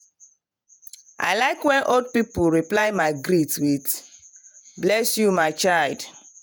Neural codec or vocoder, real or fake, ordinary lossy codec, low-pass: vocoder, 48 kHz, 128 mel bands, Vocos; fake; none; none